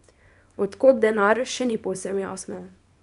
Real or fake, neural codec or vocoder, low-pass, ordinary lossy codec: fake; codec, 24 kHz, 0.9 kbps, WavTokenizer, small release; 10.8 kHz; none